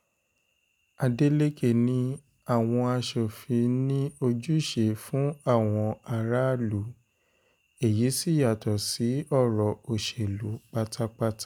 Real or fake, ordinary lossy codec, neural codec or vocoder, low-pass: real; none; none; none